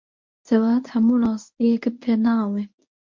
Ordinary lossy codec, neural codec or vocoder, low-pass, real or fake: MP3, 48 kbps; codec, 24 kHz, 0.9 kbps, WavTokenizer, medium speech release version 1; 7.2 kHz; fake